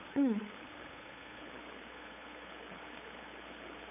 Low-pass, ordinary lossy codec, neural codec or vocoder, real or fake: 3.6 kHz; none; codec, 16 kHz, 8 kbps, FunCodec, trained on LibriTTS, 25 frames a second; fake